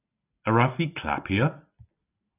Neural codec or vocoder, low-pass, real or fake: none; 3.6 kHz; real